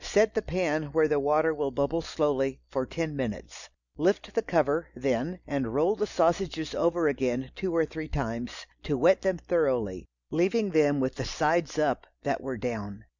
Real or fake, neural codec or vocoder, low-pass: real; none; 7.2 kHz